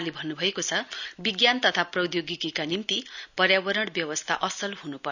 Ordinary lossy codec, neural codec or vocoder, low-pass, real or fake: none; none; 7.2 kHz; real